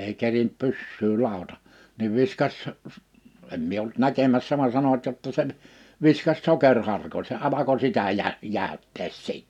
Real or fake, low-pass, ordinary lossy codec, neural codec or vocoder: real; 19.8 kHz; none; none